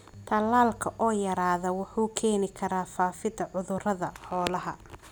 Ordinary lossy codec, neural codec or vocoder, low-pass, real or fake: none; none; none; real